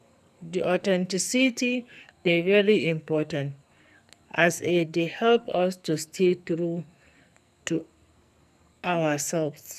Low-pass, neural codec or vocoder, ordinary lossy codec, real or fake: 14.4 kHz; codec, 44.1 kHz, 2.6 kbps, SNAC; none; fake